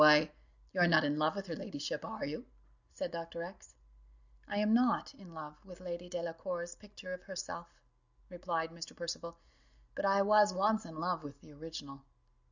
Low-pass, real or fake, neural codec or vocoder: 7.2 kHz; real; none